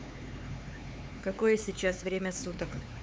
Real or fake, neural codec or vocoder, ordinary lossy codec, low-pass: fake; codec, 16 kHz, 4 kbps, X-Codec, HuBERT features, trained on LibriSpeech; none; none